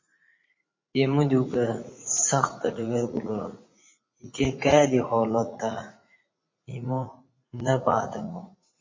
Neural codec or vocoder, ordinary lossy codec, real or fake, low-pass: vocoder, 44.1 kHz, 80 mel bands, Vocos; MP3, 32 kbps; fake; 7.2 kHz